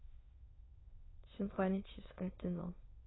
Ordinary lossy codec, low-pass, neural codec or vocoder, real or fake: AAC, 16 kbps; 7.2 kHz; autoencoder, 22.05 kHz, a latent of 192 numbers a frame, VITS, trained on many speakers; fake